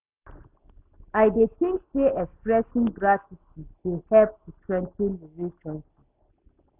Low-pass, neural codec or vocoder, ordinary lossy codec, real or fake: 3.6 kHz; none; AAC, 32 kbps; real